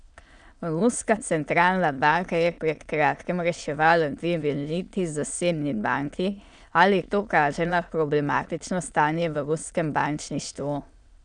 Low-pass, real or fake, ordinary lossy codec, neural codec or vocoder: 9.9 kHz; fake; none; autoencoder, 22.05 kHz, a latent of 192 numbers a frame, VITS, trained on many speakers